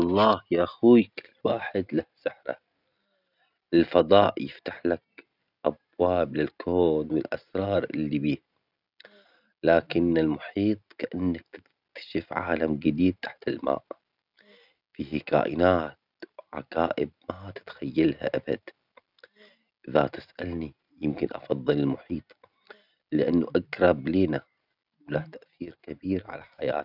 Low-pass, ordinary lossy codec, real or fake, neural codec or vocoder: 5.4 kHz; none; real; none